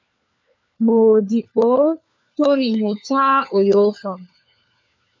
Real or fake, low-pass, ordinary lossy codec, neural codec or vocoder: fake; 7.2 kHz; MP3, 64 kbps; codec, 16 kHz, 4 kbps, FunCodec, trained on LibriTTS, 50 frames a second